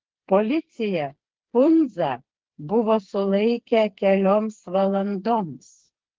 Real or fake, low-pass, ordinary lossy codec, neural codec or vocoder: fake; 7.2 kHz; Opus, 16 kbps; codec, 16 kHz, 4 kbps, FreqCodec, smaller model